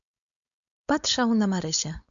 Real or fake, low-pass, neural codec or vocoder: fake; 7.2 kHz; codec, 16 kHz, 4.8 kbps, FACodec